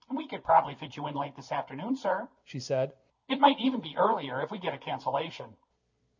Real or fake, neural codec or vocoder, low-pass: real; none; 7.2 kHz